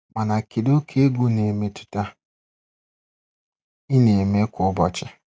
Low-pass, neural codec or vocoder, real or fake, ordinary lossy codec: none; none; real; none